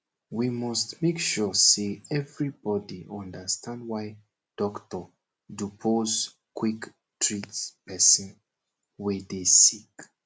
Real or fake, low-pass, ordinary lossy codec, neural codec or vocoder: real; none; none; none